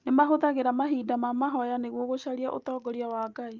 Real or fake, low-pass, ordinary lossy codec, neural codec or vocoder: real; 7.2 kHz; Opus, 24 kbps; none